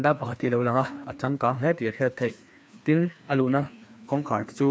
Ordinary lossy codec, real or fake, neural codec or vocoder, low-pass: none; fake; codec, 16 kHz, 2 kbps, FreqCodec, larger model; none